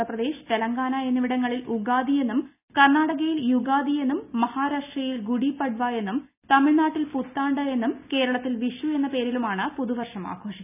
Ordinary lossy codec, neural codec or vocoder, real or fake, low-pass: none; none; real; 3.6 kHz